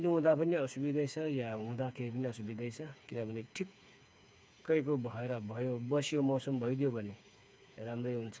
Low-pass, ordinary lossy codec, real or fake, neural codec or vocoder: none; none; fake; codec, 16 kHz, 4 kbps, FreqCodec, smaller model